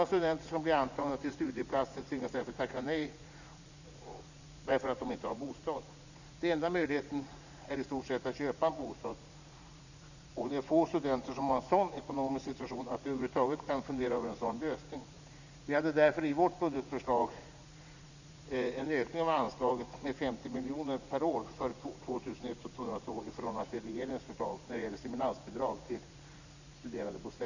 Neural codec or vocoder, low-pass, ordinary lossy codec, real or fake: vocoder, 44.1 kHz, 80 mel bands, Vocos; 7.2 kHz; none; fake